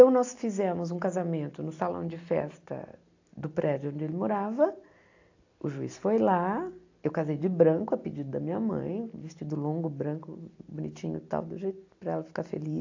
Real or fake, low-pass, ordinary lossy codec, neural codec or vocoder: real; 7.2 kHz; AAC, 48 kbps; none